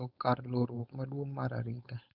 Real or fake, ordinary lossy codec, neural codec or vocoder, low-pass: fake; none; codec, 16 kHz, 4.8 kbps, FACodec; 5.4 kHz